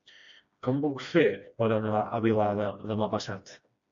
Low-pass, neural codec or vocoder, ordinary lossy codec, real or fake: 7.2 kHz; codec, 16 kHz, 1 kbps, FreqCodec, smaller model; MP3, 48 kbps; fake